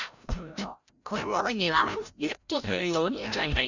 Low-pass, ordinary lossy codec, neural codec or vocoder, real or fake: 7.2 kHz; none; codec, 16 kHz, 0.5 kbps, FreqCodec, larger model; fake